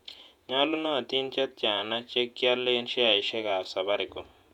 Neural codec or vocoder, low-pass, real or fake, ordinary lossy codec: vocoder, 48 kHz, 128 mel bands, Vocos; 19.8 kHz; fake; none